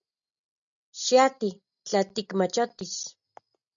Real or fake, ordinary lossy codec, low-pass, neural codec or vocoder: real; MP3, 96 kbps; 7.2 kHz; none